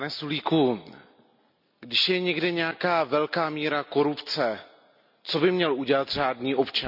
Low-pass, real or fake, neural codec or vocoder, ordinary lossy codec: 5.4 kHz; real; none; none